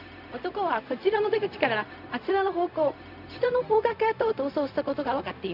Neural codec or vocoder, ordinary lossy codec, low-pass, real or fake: codec, 16 kHz, 0.4 kbps, LongCat-Audio-Codec; none; 5.4 kHz; fake